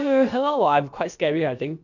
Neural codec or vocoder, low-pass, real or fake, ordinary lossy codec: codec, 16 kHz, about 1 kbps, DyCAST, with the encoder's durations; 7.2 kHz; fake; none